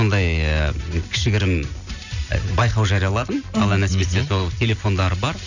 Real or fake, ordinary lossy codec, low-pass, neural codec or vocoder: real; none; 7.2 kHz; none